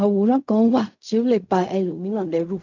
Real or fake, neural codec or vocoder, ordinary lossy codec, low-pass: fake; codec, 16 kHz in and 24 kHz out, 0.4 kbps, LongCat-Audio-Codec, fine tuned four codebook decoder; none; 7.2 kHz